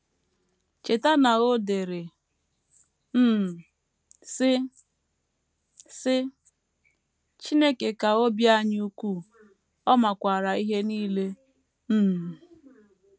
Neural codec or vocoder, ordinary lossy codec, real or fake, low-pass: none; none; real; none